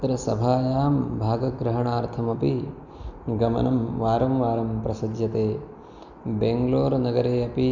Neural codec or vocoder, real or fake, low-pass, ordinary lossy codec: none; real; 7.2 kHz; Opus, 64 kbps